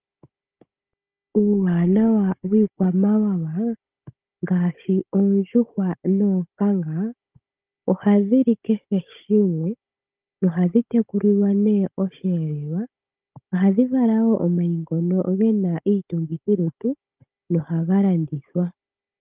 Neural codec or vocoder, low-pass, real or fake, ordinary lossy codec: codec, 16 kHz, 16 kbps, FunCodec, trained on Chinese and English, 50 frames a second; 3.6 kHz; fake; Opus, 24 kbps